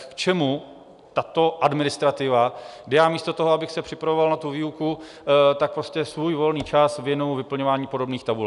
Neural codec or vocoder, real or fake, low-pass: none; real; 10.8 kHz